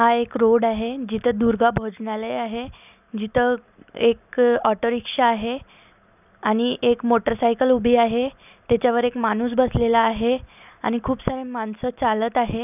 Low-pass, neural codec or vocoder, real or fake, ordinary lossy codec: 3.6 kHz; none; real; none